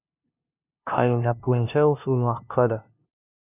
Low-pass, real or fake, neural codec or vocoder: 3.6 kHz; fake; codec, 16 kHz, 0.5 kbps, FunCodec, trained on LibriTTS, 25 frames a second